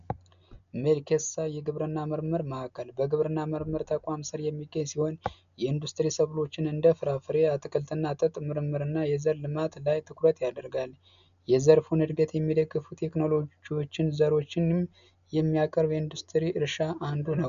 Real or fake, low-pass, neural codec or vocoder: real; 7.2 kHz; none